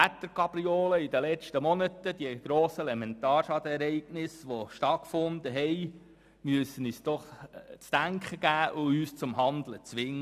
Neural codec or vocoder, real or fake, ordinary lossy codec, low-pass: none; real; none; 14.4 kHz